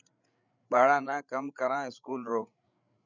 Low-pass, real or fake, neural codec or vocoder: 7.2 kHz; fake; codec, 16 kHz, 8 kbps, FreqCodec, larger model